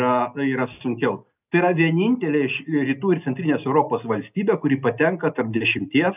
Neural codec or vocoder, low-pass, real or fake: none; 3.6 kHz; real